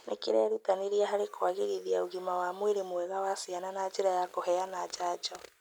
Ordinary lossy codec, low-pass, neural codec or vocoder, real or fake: none; none; none; real